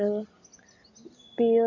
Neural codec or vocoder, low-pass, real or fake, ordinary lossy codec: none; 7.2 kHz; real; none